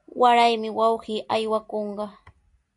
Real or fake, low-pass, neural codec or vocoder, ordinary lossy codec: real; 10.8 kHz; none; AAC, 64 kbps